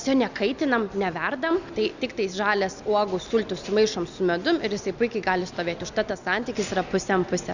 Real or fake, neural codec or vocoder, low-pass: real; none; 7.2 kHz